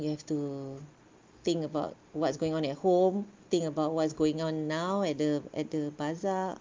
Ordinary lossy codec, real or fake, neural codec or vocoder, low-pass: Opus, 32 kbps; real; none; 7.2 kHz